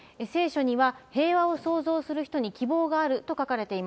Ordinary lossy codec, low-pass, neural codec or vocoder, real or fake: none; none; none; real